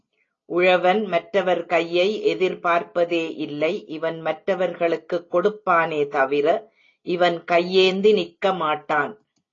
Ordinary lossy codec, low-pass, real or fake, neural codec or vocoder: AAC, 32 kbps; 7.2 kHz; real; none